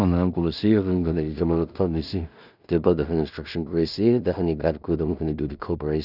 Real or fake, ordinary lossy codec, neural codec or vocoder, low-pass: fake; none; codec, 16 kHz in and 24 kHz out, 0.4 kbps, LongCat-Audio-Codec, two codebook decoder; 5.4 kHz